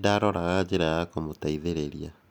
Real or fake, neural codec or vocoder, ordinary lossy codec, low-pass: real; none; none; none